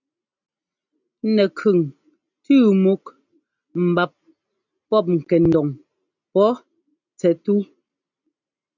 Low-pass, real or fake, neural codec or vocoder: 7.2 kHz; real; none